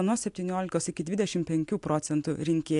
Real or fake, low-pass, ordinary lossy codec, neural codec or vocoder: real; 10.8 kHz; AAC, 64 kbps; none